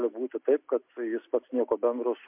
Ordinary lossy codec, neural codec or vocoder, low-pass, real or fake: MP3, 32 kbps; none; 3.6 kHz; real